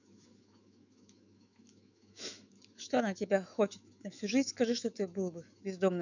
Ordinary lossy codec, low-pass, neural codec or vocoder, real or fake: none; 7.2 kHz; autoencoder, 48 kHz, 128 numbers a frame, DAC-VAE, trained on Japanese speech; fake